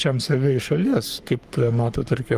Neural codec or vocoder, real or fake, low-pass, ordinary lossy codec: codec, 44.1 kHz, 3.4 kbps, Pupu-Codec; fake; 14.4 kHz; Opus, 32 kbps